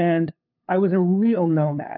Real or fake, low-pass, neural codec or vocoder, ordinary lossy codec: fake; 5.4 kHz; codec, 16 kHz, 2 kbps, FunCodec, trained on LibriTTS, 25 frames a second; AAC, 48 kbps